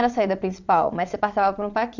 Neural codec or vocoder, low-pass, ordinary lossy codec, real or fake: vocoder, 22.05 kHz, 80 mel bands, WaveNeXt; 7.2 kHz; none; fake